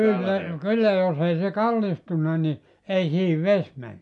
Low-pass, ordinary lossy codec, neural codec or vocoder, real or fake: 10.8 kHz; none; none; real